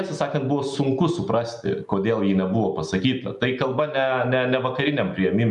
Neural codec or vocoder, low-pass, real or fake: none; 10.8 kHz; real